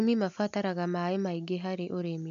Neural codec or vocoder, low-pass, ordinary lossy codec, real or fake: none; 7.2 kHz; none; real